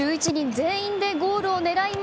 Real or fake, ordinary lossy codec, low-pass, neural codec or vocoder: real; none; none; none